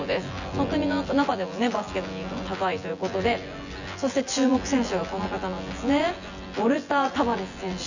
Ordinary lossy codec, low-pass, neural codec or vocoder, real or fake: none; 7.2 kHz; vocoder, 24 kHz, 100 mel bands, Vocos; fake